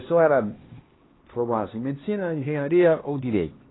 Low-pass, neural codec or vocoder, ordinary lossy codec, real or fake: 7.2 kHz; codec, 16 kHz, 2 kbps, X-Codec, HuBERT features, trained on LibriSpeech; AAC, 16 kbps; fake